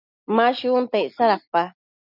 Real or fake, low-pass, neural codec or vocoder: real; 5.4 kHz; none